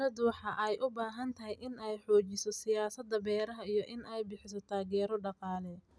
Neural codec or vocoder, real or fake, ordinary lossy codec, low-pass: none; real; none; none